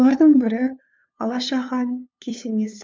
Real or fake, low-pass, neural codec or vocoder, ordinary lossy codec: fake; none; codec, 16 kHz, 2 kbps, FunCodec, trained on LibriTTS, 25 frames a second; none